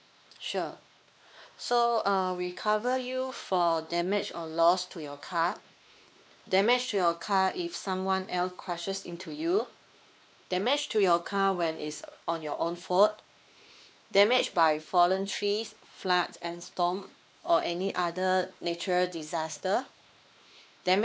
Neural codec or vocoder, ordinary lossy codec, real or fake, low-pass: codec, 16 kHz, 2 kbps, X-Codec, WavLM features, trained on Multilingual LibriSpeech; none; fake; none